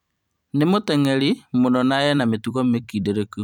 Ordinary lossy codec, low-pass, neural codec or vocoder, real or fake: none; 19.8 kHz; vocoder, 44.1 kHz, 128 mel bands every 256 samples, BigVGAN v2; fake